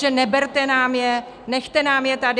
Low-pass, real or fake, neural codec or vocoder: 9.9 kHz; real; none